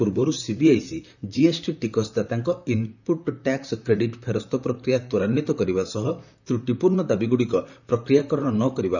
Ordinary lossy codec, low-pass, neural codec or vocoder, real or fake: none; 7.2 kHz; vocoder, 44.1 kHz, 128 mel bands, Pupu-Vocoder; fake